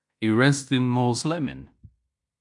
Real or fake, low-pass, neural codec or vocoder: fake; 10.8 kHz; codec, 16 kHz in and 24 kHz out, 0.9 kbps, LongCat-Audio-Codec, fine tuned four codebook decoder